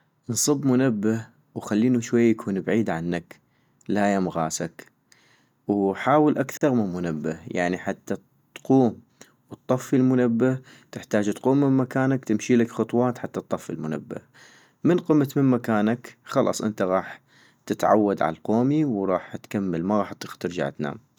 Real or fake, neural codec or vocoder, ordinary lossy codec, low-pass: real; none; none; 19.8 kHz